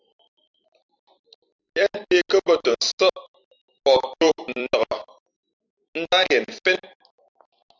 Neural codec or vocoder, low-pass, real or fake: vocoder, 44.1 kHz, 128 mel bands every 256 samples, BigVGAN v2; 7.2 kHz; fake